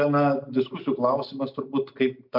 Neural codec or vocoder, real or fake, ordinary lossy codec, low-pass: none; real; MP3, 48 kbps; 5.4 kHz